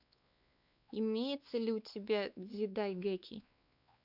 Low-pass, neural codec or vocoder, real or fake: 5.4 kHz; codec, 16 kHz, 4 kbps, X-Codec, WavLM features, trained on Multilingual LibriSpeech; fake